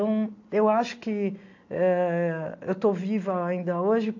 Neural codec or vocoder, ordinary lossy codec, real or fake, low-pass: none; AAC, 48 kbps; real; 7.2 kHz